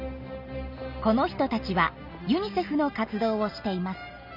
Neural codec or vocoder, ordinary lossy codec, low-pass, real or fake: none; none; 5.4 kHz; real